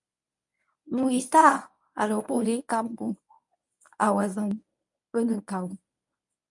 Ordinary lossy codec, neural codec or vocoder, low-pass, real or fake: MP3, 64 kbps; codec, 24 kHz, 0.9 kbps, WavTokenizer, medium speech release version 1; 10.8 kHz; fake